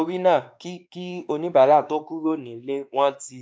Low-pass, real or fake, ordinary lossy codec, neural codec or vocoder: none; fake; none; codec, 16 kHz, 2 kbps, X-Codec, WavLM features, trained on Multilingual LibriSpeech